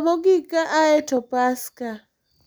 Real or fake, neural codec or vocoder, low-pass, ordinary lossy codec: real; none; none; none